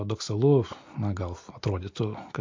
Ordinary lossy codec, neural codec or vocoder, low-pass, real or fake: MP3, 48 kbps; none; 7.2 kHz; real